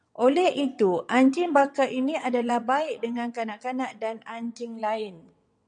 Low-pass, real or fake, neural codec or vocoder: 9.9 kHz; fake; vocoder, 22.05 kHz, 80 mel bands, WaveNeXt